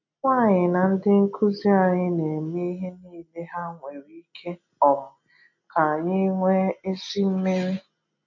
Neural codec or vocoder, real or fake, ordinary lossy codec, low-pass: none; real; none; 7.2 kHz